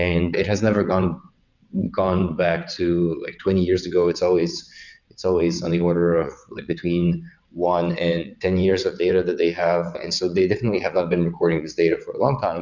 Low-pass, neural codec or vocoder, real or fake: 7.2 kHz; vocoder, 22.05 kHz, 80 mel bands, WaveNeXt; fake